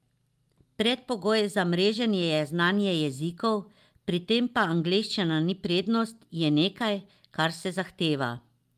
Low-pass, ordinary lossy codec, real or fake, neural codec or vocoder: 14.4 kHz; Opus, 32 kbps; real; none